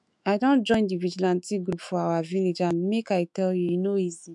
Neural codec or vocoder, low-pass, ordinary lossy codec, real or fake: autoencoder, 48 kHz, 128 numbers a frame, DAC-VAE, trained on Japanese speech; 10.8 kHz; MP3, 96 kbps; fake